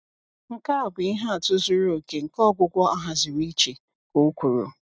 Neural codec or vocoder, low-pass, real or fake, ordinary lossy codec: none; none; real; none